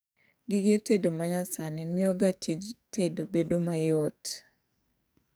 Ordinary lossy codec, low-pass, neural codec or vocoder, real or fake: none; none; codec, 44.1 kHz, 2.6 kbps, SNAC; fake